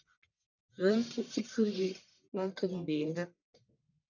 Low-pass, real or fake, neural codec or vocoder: 7.2 kHz; fake; codec, 44.1 kHz, 1.7 kbps, Pupu-Codec